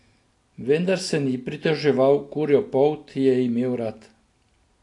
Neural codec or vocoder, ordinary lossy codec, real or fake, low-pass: none; AAC, 48 kbps; real; 10.8 kHz